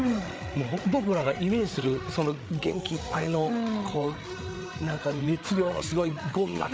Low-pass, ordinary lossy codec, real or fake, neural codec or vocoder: none; none; fake; codec, 16 kHz, 8 kbps, FreqCodec, larger model